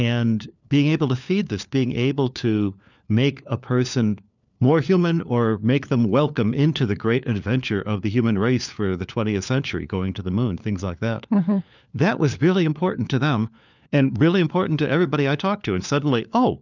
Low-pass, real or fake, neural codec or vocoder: 7.2 kHz; fake; codec, 16 kHz, 4 kbps, FunCodec, trained on LibriTTS, 50 frames a second